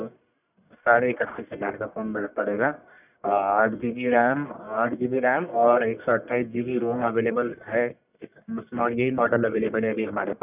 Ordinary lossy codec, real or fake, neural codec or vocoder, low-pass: none; fake; codec, 44.1 kHz, 1.7 kbps, Pupu-Codec; 3.6 kHz